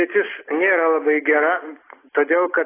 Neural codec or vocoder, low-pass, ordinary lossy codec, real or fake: none; 3.6 kHz; AAC, 16 kbps; real